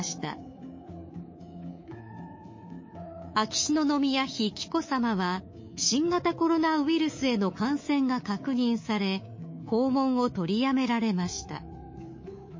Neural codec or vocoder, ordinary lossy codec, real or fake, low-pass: codec, 24 kHz, 3.1 kbps, DualCodec; MP3, 32 kbps; fake; 7.2 kHz